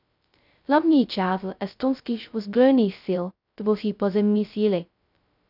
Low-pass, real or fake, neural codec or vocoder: 5.4 kHz; fake; codec, 16 kHz, 0.2 kbps, FocalCodec